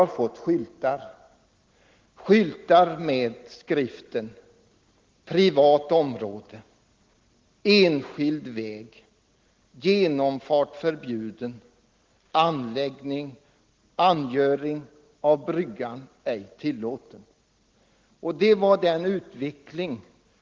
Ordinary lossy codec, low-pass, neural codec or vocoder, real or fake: Opus, 16 kbps; 7.2 kHz; none; real